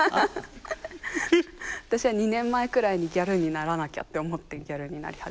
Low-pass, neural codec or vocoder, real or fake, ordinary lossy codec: none; none; real; none